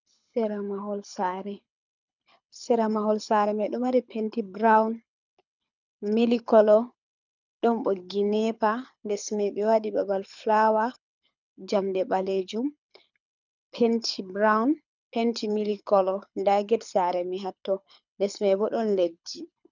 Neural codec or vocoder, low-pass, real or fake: codec, 24 kHz, 6 kbps, HILCodec; 7.2 kHz; fake